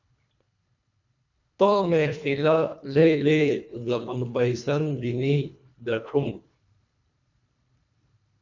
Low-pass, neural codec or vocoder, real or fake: 7.2 kHz; codec, 24 kHz, 1.5 kbps, HILCodec; fake